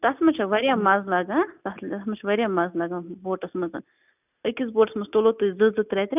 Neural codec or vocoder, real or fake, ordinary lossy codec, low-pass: none; real; none; 3.6 kHz